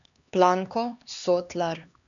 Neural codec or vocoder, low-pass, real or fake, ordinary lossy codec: codec, 16 kHz, 2 kbps, X-Codec, HuBERT features, trained on LibriSpeech; 7.2 kHz; fake; none